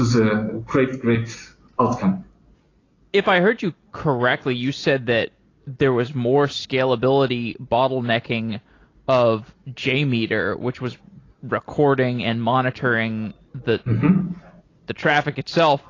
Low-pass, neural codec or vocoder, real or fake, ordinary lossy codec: 7.2 kHz; none; real; AAC, 32 kbps